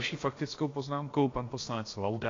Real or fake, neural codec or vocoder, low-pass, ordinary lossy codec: fake; codec, 16 kHz, about 1 kbps, DyCAST, with the encoder's durations; 7.2 kHz; AAC, 32 kbps